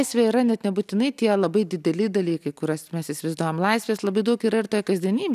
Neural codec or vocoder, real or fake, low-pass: none; real; 14.4 kHz